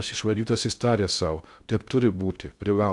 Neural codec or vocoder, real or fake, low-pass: codec, 16 kHz in and 24 kHz out, 0.6 kbps, FocalCodec, streaming, 2048 codes; fake; 10.8 kHz